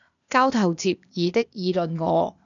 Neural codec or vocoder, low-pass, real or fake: codec, 16 kHz, 0.8 kbps, ZipCodec; 7.2 kHz; fake